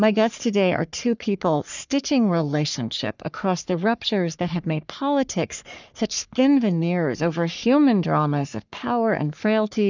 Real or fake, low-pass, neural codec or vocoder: fake; 7.2 kHz; codec, 44.1 kHz, 3.4 kbps, Pupu-Codec